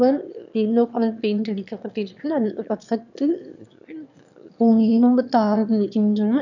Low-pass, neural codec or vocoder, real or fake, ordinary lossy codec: 7.2 kHz; autoencoder, 22.05 kHz, a latent of 192 numbers a frame, VITS, trained on one speaker; fake; none